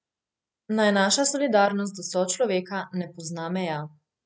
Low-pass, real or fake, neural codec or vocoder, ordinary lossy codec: none; real; none; none